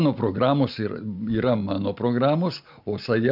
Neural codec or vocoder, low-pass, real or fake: none; 5.4 kHz; real